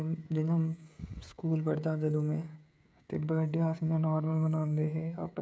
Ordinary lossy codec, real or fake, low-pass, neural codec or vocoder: none; fake; none; codec, 16 kHz, 16 kbps, FreqCodec, smaller model